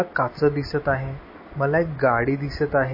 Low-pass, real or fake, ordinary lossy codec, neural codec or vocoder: 5.4 kHz; real; MP3, 24 kbps; none